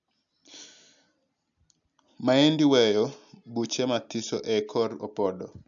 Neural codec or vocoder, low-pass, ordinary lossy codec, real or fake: none; 7.2 kHz; none; real